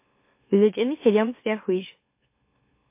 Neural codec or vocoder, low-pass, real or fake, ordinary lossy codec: autoencoder, 44.1 kHz, a latent of 192 numbers a frame, MeloTTS; 3.6 kHz; fake; MP3, 24 kbps